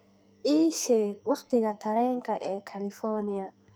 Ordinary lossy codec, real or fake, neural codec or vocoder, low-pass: none; fake; codec, 44.1 kHz, 2.6 kbps, SNAC; none